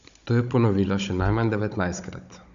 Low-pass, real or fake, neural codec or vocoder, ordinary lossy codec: 7.2 kHz; fake; codec, 16 kHz, 16 kbps, FunCodec, trained on Chinese and English, 50 frames a second; MP3, 64 kbps